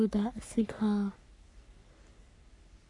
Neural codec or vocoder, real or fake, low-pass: codec, 44.1 kHz, 3.4 kbps, Pupu-Codec; fake; 10.8 kHz